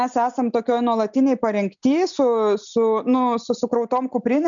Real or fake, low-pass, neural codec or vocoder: real; 7.2 kHz; none